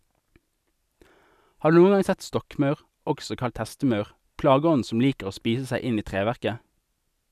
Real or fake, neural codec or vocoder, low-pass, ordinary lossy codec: real; none; 14.4 kHz; none